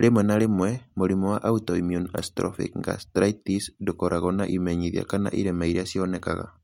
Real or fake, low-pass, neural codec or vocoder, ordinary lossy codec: real; 19.8 kHz; none; MP3, 64 kbps